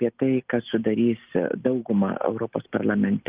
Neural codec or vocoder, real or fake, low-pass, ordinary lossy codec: none; real; 3.6 kHz; Opus, 32 kbps